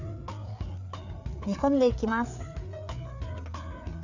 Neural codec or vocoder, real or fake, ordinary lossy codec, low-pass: codec, 16 kHz, 4 kbps, FreqCodec, larger model; fake; none; 7.2 kHz